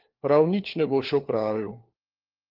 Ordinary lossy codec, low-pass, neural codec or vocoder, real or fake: Opus, 16 kbps; 5.4 kHz; codec, 16 kHz, 4 kbps, FunCodec, trained on LibriTTS, 50 frames a second; fake